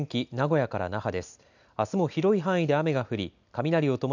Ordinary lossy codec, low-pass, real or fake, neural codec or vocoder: none; 7.2 kHz; real; none